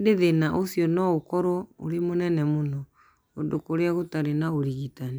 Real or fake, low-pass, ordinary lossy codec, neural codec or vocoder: real; none; none; none